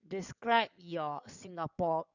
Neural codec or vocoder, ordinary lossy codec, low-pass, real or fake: codec, 16 kHz in and 24 kHz out, 2.2 kbps, FireRedTTS-2 codec; none; 7.2 kHz; fake